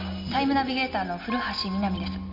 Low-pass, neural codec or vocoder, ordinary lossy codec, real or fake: 5.4 kHz; none; MP3, 32 kbps; real